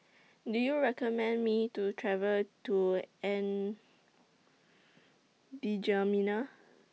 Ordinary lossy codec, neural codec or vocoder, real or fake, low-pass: none; none; real; none